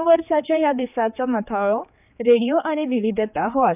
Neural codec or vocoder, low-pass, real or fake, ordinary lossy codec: codec, 16 kHz, 4 kbps, X-Codec, HuBERT features, trained on general audio; 3.6 kHz; fake; none